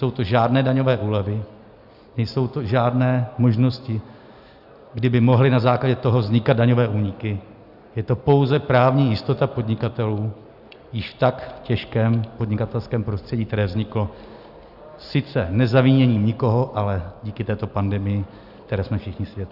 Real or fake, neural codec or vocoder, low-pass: real; none; 5.4 kHz